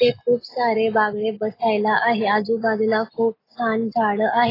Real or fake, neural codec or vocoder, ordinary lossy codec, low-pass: real; none; AAC, 24 kbps; 5.4 kHz